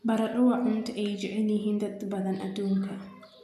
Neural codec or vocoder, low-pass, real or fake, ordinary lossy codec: none; 14.4 kHz; real; none